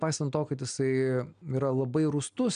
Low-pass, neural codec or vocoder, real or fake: 9.9 kHz; none; real